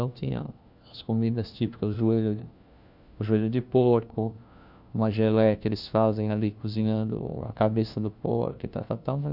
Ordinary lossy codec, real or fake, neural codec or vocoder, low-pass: none; fake; codec, 16 kHz, 1 kbps, FunCodec, trained on LibriTTS, 50 frames a second; 5.4 kHz